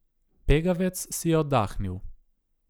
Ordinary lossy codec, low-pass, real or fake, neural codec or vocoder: none; none; real; none